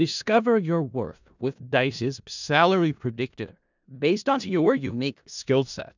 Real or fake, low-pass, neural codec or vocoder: fake; 7.2 kHz; codec, 16 kHz in and 24 kHz out, 0.4 kbps, LongCat-Audio-Codec, four codebook decoder